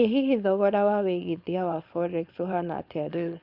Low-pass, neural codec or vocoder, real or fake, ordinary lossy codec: 5.4 kHz; codec, 16 kHz, 4.8 kbps, FACodec; fake; Opus, 64 kbps